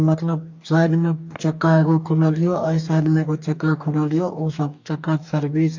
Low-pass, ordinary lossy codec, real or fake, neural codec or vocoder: 7.2 kHz; AAC, 48 kbps; fake; codec, 44.1 kHz, 2.6 kbps, DAC